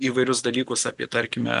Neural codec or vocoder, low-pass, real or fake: none; 10.8 kHz; real